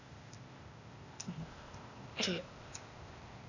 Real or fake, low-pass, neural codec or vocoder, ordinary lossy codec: fake; 7.2 kHz; codec, 16 kHz, 0.8 kbps, ZipCodec; none